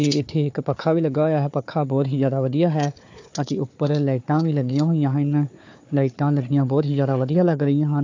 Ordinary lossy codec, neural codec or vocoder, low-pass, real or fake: AAC, 48 kbps; codec, 16 kHz, 4 kbps, FunCodec, trained on Chinese and English, 50 frames a second; 7.2 kHz; fake